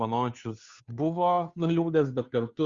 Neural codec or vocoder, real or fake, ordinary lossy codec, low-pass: codec, 16 kHz, 2 kbps, FunCodec, trained on Chinese and English, 25 frames a second; fake; Opus, 64 kbps; 7.2 kHz